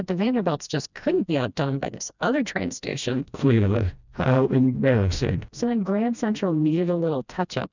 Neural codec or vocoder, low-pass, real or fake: codec, 16 kHz, 1 kbps, FreqCodec, smaller model; 7.2 kHz; fake